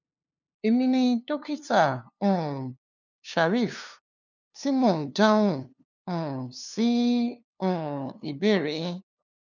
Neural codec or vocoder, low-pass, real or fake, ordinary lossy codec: codec, 16 kHz, 2 kbps, FunCodec, trained on LibriTTS, 25 frames a second; 7.2 kHz; fake; none